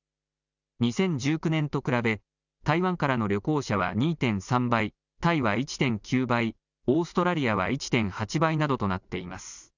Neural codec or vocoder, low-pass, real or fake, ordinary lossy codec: none; 7.2 kHz; real; none